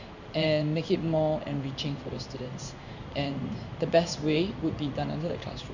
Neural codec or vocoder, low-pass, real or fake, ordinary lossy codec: codec, 16 kHz in and 24 kHz out, 1 kbps, XY-Tokenizer; 7.2 kHz; fake; none